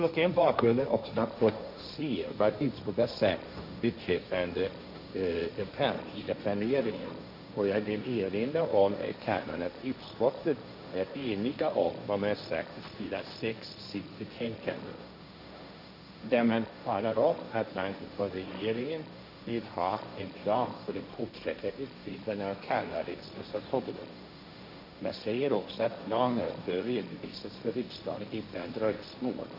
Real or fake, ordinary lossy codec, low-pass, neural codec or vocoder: fake; AAC, 48 kbps; 5.4 kHz; codec, 16 kHz, 1.1 kbps, Voila-Tokenizer